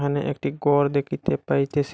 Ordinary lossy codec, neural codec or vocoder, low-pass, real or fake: none; none; none; real